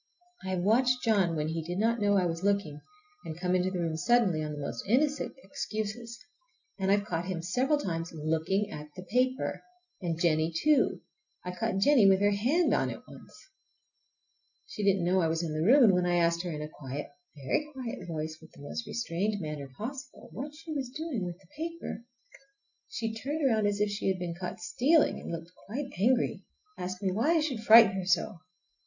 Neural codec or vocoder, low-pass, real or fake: none; 7.2 kHz; real